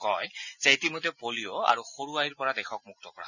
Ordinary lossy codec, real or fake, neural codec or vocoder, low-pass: none; real; none; 7.2 kHz